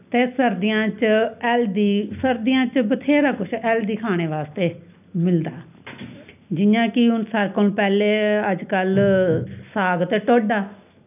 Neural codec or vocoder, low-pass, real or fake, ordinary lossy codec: none; 3.6 kHz; real; none